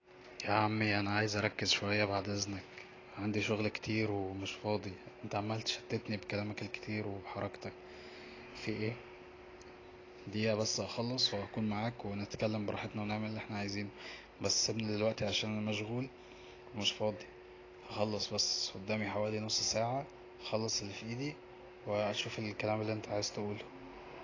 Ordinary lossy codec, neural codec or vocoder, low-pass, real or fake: AAC, 32 kbps; none; 7.2 kHz; real